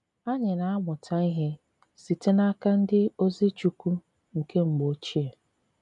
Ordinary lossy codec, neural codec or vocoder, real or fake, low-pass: none; none; real; 10.8 kHz